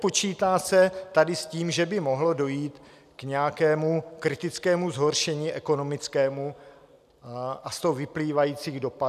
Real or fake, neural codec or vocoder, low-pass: real; none; 14.4 kHz